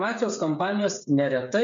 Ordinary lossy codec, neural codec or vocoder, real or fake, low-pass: MP3, 48 kbps; codec, 16 kHz, 8 kbps, FreqCodec, smaller model; fake; 7.2 kHz